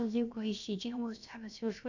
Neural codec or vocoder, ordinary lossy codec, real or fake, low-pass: codec, 16 kHz, about 1 kbps, DyCAST, with the encoder's durations; none; fake; 7.2 kHz